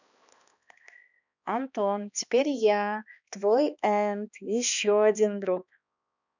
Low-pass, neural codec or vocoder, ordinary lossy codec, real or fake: 7.2 kHz; codec, 16 kHz, 2 kbps, X-Codec, HuBERT features, trained on balanced general audio; none; fake